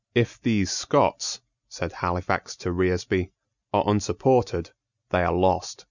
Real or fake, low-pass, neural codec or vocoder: real; 7.2 kHz; none